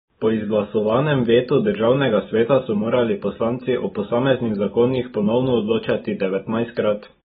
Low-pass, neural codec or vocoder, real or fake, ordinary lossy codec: 19.8 kHz; none; real; AAC, 16 kbps